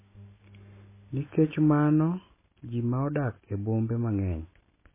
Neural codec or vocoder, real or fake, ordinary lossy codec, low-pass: none; real; MP3, 16 kbps; 3.6 kHz